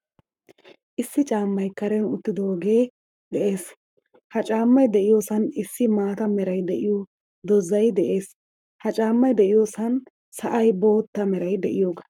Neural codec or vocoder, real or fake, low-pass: codec, 44.1 kHz, 7.8 kbps, Pupu-Codec; fake; 19.8 kHz